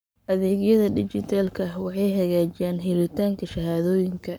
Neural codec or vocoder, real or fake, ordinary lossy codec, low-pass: codec, 44.1 kHz, 7.8 kbps, Pupu-Codec; fake; none; none